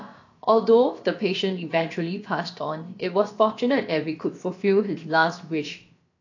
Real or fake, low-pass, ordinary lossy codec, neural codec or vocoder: fake; 7.2 kHz; AAC, 48 kbps; codec, 16 kHz, about 1 kbps, DyCAST, with the encoder's durations